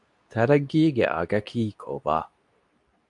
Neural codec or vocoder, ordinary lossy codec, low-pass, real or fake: codec, 24 kHz, 0.9 kbps, WavTokenizer, medium speech release version 2; MP3, 64 kbps; 10.8 kHz; fake